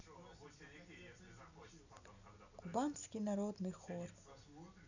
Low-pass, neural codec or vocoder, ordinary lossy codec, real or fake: 7.2 kHz; none; none; real